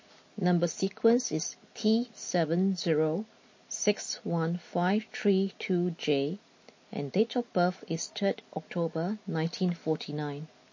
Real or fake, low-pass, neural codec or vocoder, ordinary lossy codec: real; 7.2 kHz; none; MP3, 32 kbps